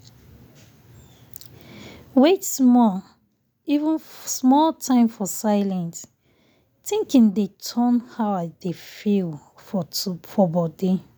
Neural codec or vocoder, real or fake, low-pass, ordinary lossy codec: none; real; none; none